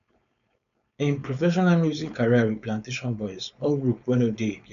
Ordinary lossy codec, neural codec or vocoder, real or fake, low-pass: none; codec, 16 kHz, 4.8 kbps, FACodec; fake; 7.2 kHz